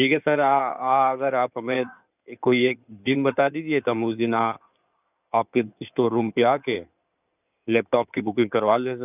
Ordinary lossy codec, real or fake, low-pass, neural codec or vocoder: none; fake; 3.6 kHz; codec, 16 kHz in and 24 kHz out, 2.2 kbps, FireRedTTS-2 codec